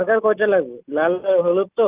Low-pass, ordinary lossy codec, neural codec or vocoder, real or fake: 3.6 kHz; Opus, 32 kbps; none; real